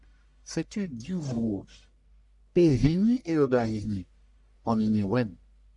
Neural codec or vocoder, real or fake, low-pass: codec, 44.1 kHz, 1.7 kbps, Pupu-Codec; fake; 10.8 kHz